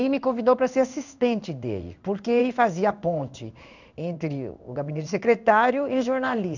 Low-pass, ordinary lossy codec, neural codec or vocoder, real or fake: 7.2 kHz; none; codec, 16 kHz in and 24 kHz out, 1 kbps, XY-Tokenizer; fake